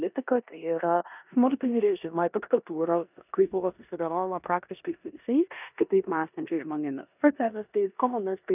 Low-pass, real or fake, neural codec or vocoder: 3.6 kHz; fake; codec, 16 kHz in and 24 kHz out, 0.9 kbps, LongCat-Audio-Codec, four codebook decoder